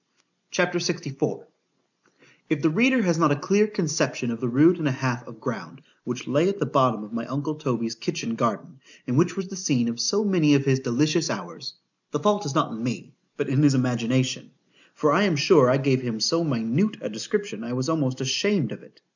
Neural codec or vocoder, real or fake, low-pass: none; real; 7.2 kHz